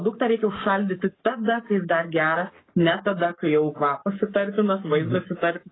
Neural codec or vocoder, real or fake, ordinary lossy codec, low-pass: codec, 44.1 kHz, 7.8 kbps, Pupu-Codec; fake; AAC, 16 kbps; 7.2 kHz